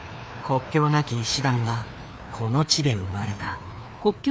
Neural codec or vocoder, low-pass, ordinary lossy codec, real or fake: codec, 16 kHz, 2 kbps, FreqCodec, larger model; none; none; fake